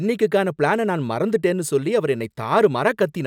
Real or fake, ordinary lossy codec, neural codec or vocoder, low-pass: real; none; none; 19.8 kHz